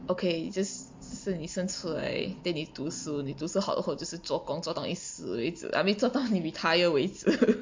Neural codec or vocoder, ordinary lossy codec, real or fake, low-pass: none; MP3, 48 kbps; real; 7.2 kHz